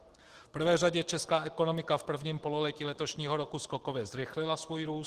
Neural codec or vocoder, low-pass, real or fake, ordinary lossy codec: autoencoder, 48 kHz, 128 numbers a frame, DAC-VAE, trained on Japanese speech; 14.4 kHz; fake; Opus, 16 kbps